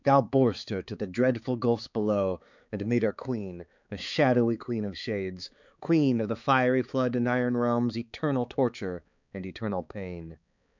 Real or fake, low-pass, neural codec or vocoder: fake; 7.2 kHz; codec, 16 kHz, 4 kbps, X-Codec, HuBERT features, trained on balanced general audio